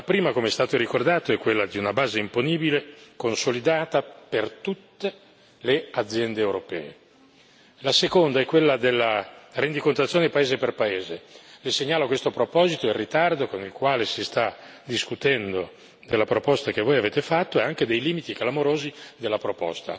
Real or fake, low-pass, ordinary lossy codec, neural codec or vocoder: real; none; none; none